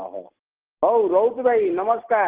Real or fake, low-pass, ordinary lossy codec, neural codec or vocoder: real; 3.6 kHz; Opus, 16 kbps; none